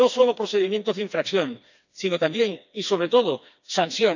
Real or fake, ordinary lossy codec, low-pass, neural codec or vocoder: fake; none; 7.2 kHz; codec, 16 kHz, 2 kbps, FreqCodec, smaller model